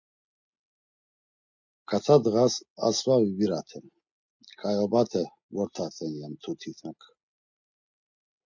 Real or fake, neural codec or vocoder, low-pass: real; none; 7.2 kHz